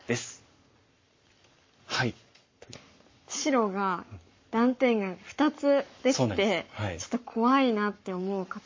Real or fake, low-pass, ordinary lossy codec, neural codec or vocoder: fake; 7.2 kHz; MP3, 32 kbps; codec, 44.1 kHz, 7.8 kbps, Pupu-Codec